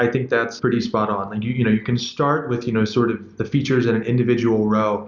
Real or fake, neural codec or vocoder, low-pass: real; none; 7.2 kHz